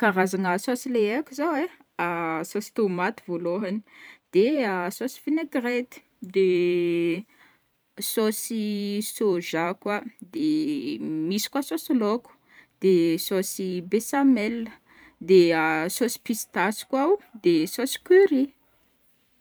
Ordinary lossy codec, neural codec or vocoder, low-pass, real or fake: none; vocoder, 44.1 kHz, 128 mel bands every 512 samples, BigVGAN v2; none; fake